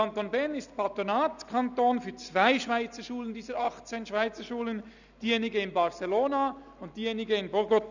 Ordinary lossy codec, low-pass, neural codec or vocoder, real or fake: none; 7.2 kHz; none; real